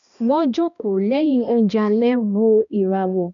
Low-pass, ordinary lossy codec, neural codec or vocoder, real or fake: 7.2 kHz; none; codec, 16 kHz, 1 kbps, X-Codec, HuBERT features, trained on balanced general audio; fake